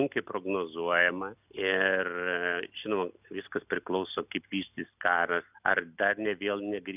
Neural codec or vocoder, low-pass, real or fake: none; 3.6 kHz; real